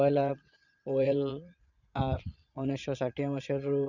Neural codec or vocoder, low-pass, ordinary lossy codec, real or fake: vocoder, 22.05 kHz, 80 mel bands, Vocos; 7.2 kHz; none; fake